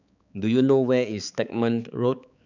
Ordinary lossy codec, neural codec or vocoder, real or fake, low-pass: none; codec, 16 kHz, 4 kbps, X-Codec, HuBERT features, trained on balanced general audio; fake; 7.2 kHz